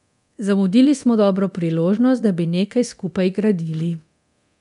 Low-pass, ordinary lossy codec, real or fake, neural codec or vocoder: 10.8 kHz; none; fake; codec, 24 kHz, 0.9 kbps, DualCodec